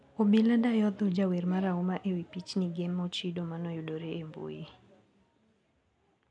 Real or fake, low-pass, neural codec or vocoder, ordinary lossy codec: real; 9.9 kHz; none; none